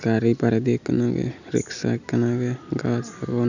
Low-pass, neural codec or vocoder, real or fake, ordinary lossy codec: 7.2 kHz; none; real; none